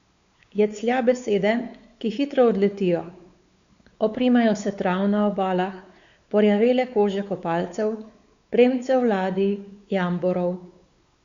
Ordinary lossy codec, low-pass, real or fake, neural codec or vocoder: Opus, 64 kbps; 7.2 kHz; fake; codec, 16 kHz, 4 kbps, X-Codec, WavLM features, trained on Multilingual LibriSpeech